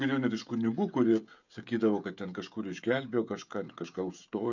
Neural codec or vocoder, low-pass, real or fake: codec, 16 kHz, 16 kbps, FreqCodec, smaller model; 7.2 kHz; fake